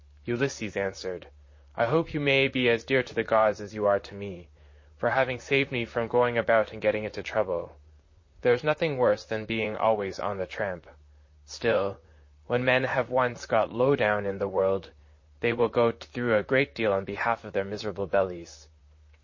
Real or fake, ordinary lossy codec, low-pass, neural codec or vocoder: fake; MP3, 32 kbps; 7.2 kHz; vocoder, 44.1 kHz, 128 mel bands, Pupu-Vocoder